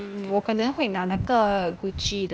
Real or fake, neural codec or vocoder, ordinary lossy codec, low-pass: fake; codec, 16 kHz, 0.8 kbps, ZipCodec; none; none